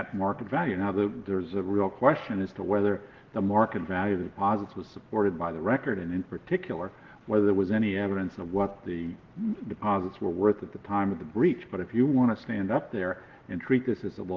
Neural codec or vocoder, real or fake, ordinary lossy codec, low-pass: none; real; Opus, 16 kbps; 7.2 kHz